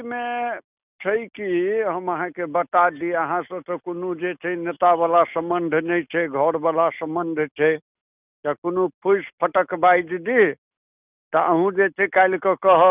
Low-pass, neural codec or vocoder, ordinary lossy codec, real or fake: 3.6 kHz; none; none; real